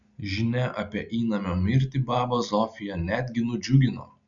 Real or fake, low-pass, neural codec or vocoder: real; 7.2 kHz; none